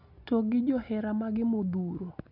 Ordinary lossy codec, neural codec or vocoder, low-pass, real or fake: none; none; 5.4 kHz; real